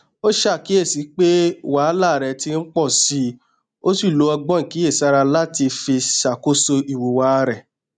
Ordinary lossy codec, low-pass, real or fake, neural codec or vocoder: none; 9.9 kHz; real; none